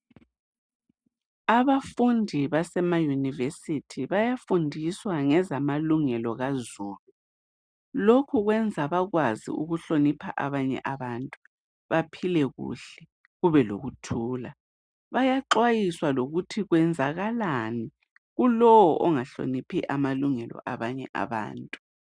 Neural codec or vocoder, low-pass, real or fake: none; 9.9 kHz; real